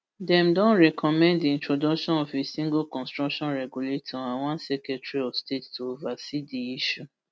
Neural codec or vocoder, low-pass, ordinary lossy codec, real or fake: none; none; none; real